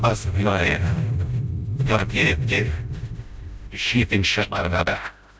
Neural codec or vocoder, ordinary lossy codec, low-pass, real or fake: codec, 16 kHz, 0.5 kbps, FreqCodec, smaller model; none; none; fake